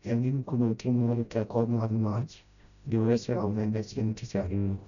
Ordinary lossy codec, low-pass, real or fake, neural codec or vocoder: none; 7.2 kHz; fake; codec, 16 kHz, 0.5 kbps, FreqCodec, smaller model